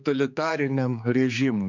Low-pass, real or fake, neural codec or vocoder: 7.2 kHz; fake; codec, 16 kHz, 4 kbps, X-Codec, HuBERT features, trained on general audio